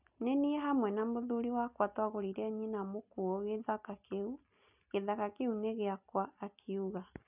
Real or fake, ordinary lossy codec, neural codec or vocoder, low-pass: real; none; none; 3.6 kHz